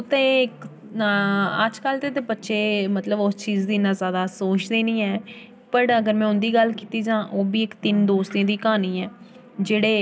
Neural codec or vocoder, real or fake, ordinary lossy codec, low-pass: none; real; none; none